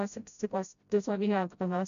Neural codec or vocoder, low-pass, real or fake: codec, 16 kHz, 0.5 kbps, FreqCodec, smaller model; 7.2 kHz; fake